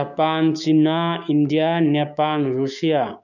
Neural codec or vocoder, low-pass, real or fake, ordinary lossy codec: codec, 16 kHz, 6 kbps, DAC; 7.2 kHz; fake; none